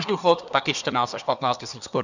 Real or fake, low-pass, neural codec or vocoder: fake; 7.2 kHz; codec, 24 kHz, 1 kbps, SNAC